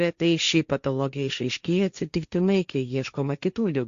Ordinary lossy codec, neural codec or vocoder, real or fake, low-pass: Opus, 64 kbps; codec, 16 kHz, 1.1 kbps, Voila-Tokenizer; fake; 7.2 kHz